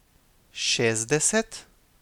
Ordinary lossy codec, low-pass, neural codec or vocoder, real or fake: none; 19.8 kHz; none; real